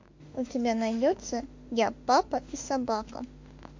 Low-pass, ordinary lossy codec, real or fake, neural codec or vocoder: 7.2 kHz; MP3, 48 kbps; fake; autoencoder, 48 kHz, 32 numbers a frame, DAC-VAE, trained on Japanese speech